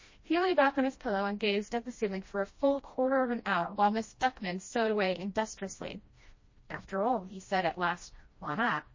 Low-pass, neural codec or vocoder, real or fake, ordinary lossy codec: 7.2 kHz; codec, 16 kHz, 1 kbps, FreqCodec, smaller model; fake; MP3, 32 kbps